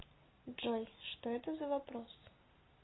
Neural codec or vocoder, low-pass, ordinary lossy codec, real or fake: none; 7.2 kHz; AAC, 16 kbps; real